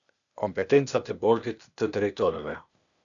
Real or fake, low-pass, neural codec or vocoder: fake; 7.2 kHz; codec, 16 kHz, 0.8 kbps, ZipCodec